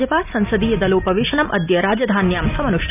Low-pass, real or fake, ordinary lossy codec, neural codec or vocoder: 3.6 kHz; real; none; none